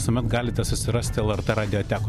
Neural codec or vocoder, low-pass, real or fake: none; 14.4 kHz; real